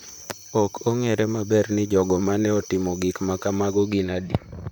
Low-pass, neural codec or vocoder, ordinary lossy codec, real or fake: none; vocoder, 44.1 kHz, 128 mel bands, Pupu-Vocoder; none; fake